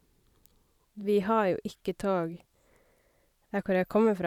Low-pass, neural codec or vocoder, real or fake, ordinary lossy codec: 19.8 kHz; vocoder, 44.1 kHz, 128 mel bands every 512 samples, BigVGAN v2; fake; none